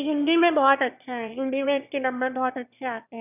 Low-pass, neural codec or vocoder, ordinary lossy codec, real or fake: 3.6 kHz; autoencoder, 22.05 kHz, a latent of 192 numbers a frame, VITS, trained on one speaker; none; fake